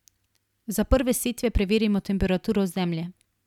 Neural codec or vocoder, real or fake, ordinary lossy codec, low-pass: none; real; none; 19.8 kHz